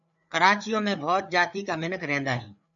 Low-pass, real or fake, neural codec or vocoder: 7.2 kHz; fake; codec, 16 kHz, 4 kbps, FreqCodec, larger model